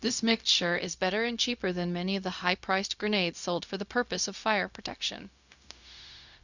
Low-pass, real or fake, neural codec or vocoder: 7.2 kHz; fake; codec, 16 kHz, 0.4 kbps, LongCat-Audio-Codec